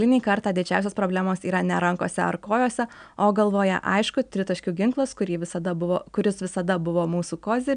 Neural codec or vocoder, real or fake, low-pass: none; real; 9.9 kHz